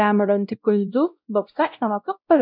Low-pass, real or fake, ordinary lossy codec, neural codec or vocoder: 5.4 kHz; fake; none; codec, 16 kHz, 0.5 kbps, X-Codec, WavLM features, trained on Multilingual LibriSpeech